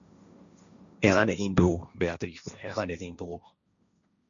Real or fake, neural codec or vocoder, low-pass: fake; codec, 16 kHz, 1.1 kbps, Voila-Tokenizer; 7.2 kHz